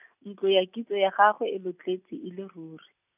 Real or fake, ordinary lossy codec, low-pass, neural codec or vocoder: real; none; 3.6 kHz; none